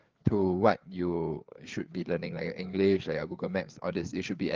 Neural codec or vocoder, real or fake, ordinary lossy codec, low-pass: codec, 16 kHz, 4 kbps, FreqCodec, larger model; fake; Opus, 32 kbps; 7.2 kHz